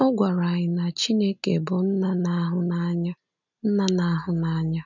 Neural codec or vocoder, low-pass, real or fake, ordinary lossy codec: none; 7.2 kHz; real; none